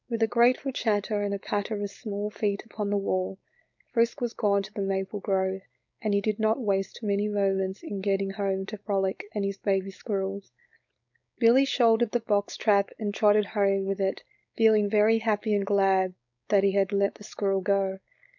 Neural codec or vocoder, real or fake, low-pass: codec, 16 kHz, 4.8 kbps, FACodec; fake; 7.2 kHz